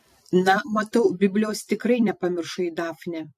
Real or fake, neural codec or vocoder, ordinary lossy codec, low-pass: fake; vocoder, 44.1 kHz, 128 mel bands every 256 samples, BigVGAN v2; MP3, 64 kbps; 14.4 kHz